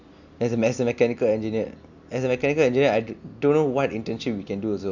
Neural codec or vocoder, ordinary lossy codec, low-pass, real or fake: none; none; 7.2 kHz; real